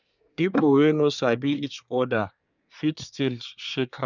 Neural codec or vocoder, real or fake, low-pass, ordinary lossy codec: codec, 24 kHz, 1 kbps, SNAC; fake; 7.2 kHz; none